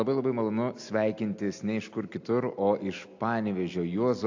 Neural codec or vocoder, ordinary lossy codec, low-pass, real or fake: none; AAC, 48 kbps; 7.2 kHz; real